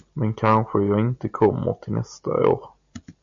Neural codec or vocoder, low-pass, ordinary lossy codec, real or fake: none; 7.2 kHz; MP3, 64 kbps; real